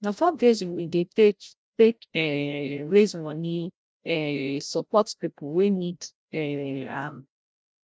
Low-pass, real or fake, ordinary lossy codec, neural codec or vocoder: none; fake; none; codec, 16 kHz, 0.5 kbps, FreqCodec, larger model